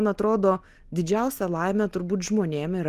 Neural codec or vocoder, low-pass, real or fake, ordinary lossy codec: none; 14.4 kHz; real; Opus, 24 kbps